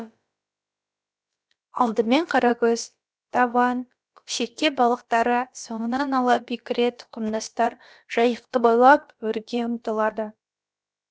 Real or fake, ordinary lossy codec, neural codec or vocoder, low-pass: fake; none; codec, 16 kHz, about 1 kbps, DyCAST, with the encoder's durations; none